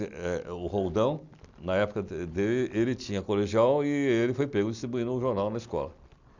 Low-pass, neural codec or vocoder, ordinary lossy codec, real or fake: 7.2 kHz; none; none; real